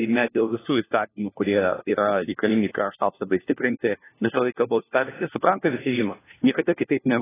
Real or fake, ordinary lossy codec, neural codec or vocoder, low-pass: fake; AAC, 16 kbps; codec, 16 kHz, 1 kbps, FunCodec, trained on LibriTTS, 50 frames a second; 3.6 kHz